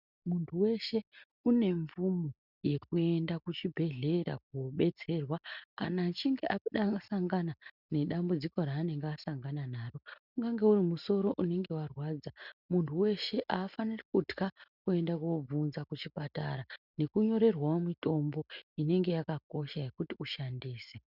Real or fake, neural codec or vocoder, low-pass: real; none; 5.4 kHz